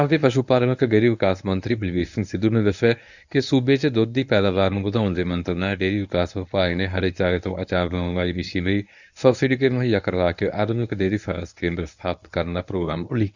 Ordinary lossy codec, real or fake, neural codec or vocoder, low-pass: none; fake; codec, 24 kHz, 0.9 kbps, WavTokenizer, medium speech release version 1; 7.2 kHz